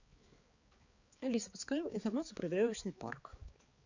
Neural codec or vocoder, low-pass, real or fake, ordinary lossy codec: codec, 16 kHz, 4 kbps, X-Codec, HuBERT features, trained on balanced general audio; 7.2 kHz; fake; Opus, 64 kbps